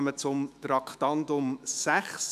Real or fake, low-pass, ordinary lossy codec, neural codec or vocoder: fake; 14.4 kHz; none; autoencoder, 48 kHz, 128 numbers a frame, DAC-VAE, trained on Japanese speech